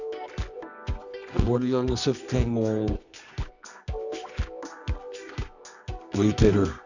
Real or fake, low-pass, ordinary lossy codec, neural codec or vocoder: fake; 7.2 kHz; none; codec, 24 kHz, 0.9 kbps, WavTokenizer, medium music audio release